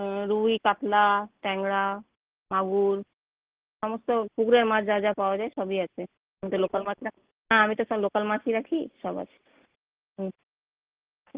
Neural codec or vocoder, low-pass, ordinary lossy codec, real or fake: none; 3.6 kHz; Opus, 16 kbps; real